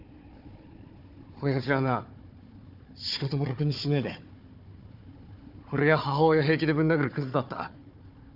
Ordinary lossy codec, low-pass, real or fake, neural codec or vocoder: none; 5.4 kHz; fake; codec, 16 kHz, 4 kbps, FunCodec, trained on Chinese and English, 50 frames a second